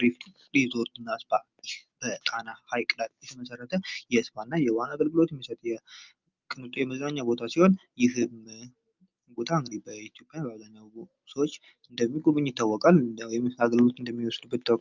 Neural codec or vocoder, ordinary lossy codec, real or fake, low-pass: none; Opus, 24 kbps; real; 7.2 kHz